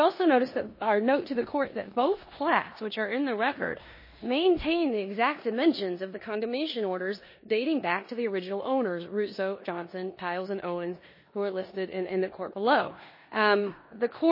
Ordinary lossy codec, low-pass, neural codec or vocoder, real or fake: MP3, 24 kbps; 5.4 kHz; codec, 16 kHz in and 24 kHz out, 0.9 kbps, LongCat-Audio-Codec, four codebook decoder; fake